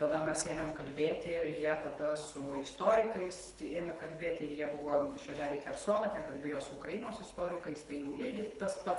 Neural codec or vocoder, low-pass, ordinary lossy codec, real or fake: codec, 24 kHz, 3 kbps, HILCodec; 10.8 kHz; MP3, 64 kbps; fake